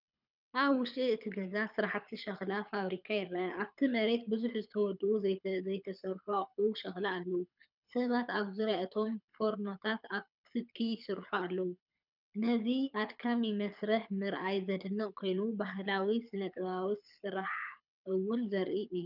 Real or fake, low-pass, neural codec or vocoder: fake; 5.4 kHz; codec, 24 kHz, 6 kbps, HILCodec